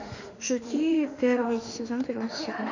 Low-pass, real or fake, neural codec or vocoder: 7.2 kHz; fake; autoencoder, 48 kHz, 32 numbers a frame, DAC-VAE, trained on Japanese speech